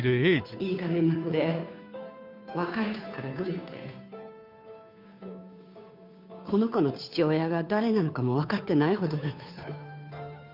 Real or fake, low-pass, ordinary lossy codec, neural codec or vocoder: fake; 5.4 kHz; none; codec, 16 kHz, 2 kbps, FunCodec, trained on Chinese and English, 25 frames a second